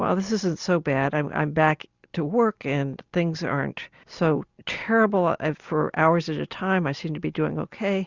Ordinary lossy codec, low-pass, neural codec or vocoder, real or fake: Opus, 64 kbps; 7.2 kHz; none; real